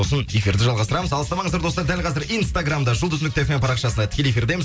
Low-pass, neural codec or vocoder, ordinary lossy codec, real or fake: none; none; none; real